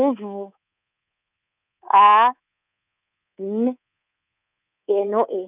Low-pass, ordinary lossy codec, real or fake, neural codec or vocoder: 3.6 kHz; none; fake; codec, 24 kHz, 3.1 kbps, DualCodec